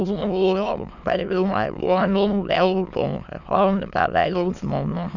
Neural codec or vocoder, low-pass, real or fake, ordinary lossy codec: autoencoder, 22.05 kHz, a latent of 192 numbers a frame, VITS, trained on many speakers; 7.2 kHz; fake; none